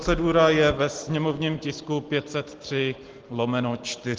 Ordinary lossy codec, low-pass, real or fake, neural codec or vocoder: Opus, 16 kbps; 7.2 kHz; real; none